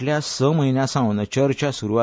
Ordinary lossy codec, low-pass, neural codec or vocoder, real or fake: none; none; none; real